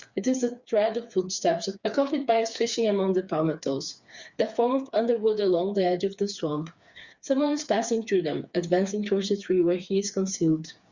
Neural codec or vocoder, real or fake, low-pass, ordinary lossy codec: codec, 16 kHz, 4 kbps, FreqCodec, smaller model; fake; 7.2 kHz; Opus, 64 kbps